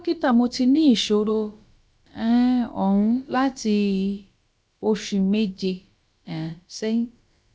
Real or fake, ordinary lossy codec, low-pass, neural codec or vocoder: fake; none; none; codec, 16 kHz, about 1 kbps, DyCAST, with the encoder's durations